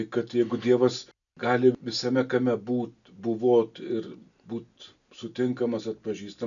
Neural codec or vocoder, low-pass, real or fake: none; 7.2 kHz; real